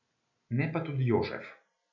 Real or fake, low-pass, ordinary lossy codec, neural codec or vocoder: real; 7.2 kHz; none; none